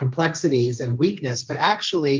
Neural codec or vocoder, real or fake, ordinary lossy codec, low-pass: codec, 16 kHz, 1.1 kbps, Voila-Tokenizer; fake; Opus, 24 kbps; 7.2 kHz